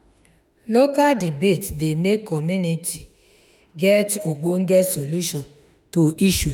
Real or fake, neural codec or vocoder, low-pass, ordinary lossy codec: fake; autoencoder, 48 kHz, 32 numbers a frame, DAC-VAE, trained on Japanese speech; none; none